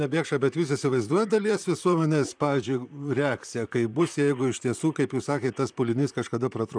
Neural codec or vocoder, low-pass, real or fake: vocoder, 44.1 kHz, 128 mel bands, Pupu-Vocoder; 9.9 kHz; fake